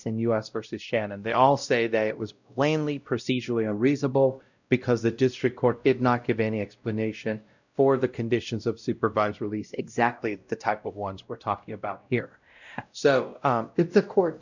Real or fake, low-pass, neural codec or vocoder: fake; 7.2 kHz; codec, 16 kHz, 0.5 kbps, X-Codec, WavLM features, trained on Multilingual LibriSpeech